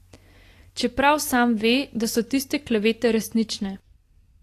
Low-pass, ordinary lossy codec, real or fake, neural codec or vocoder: 14.4 kHz; AAC, 48 kbps; real; none